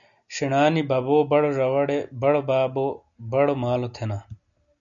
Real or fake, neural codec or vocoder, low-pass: real; none; 7.2 kHz